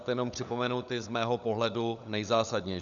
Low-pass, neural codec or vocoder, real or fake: 7.2 kHz; codec, 16 kHz, 16 kbps, FunCodec, trained on LibriTTS, 50 frames a second; fake